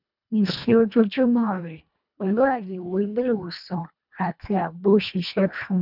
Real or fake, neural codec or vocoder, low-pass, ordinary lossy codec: fake; codec, 24 kHz, 1.5 kbps, HILCodec; 5.4 kHz; none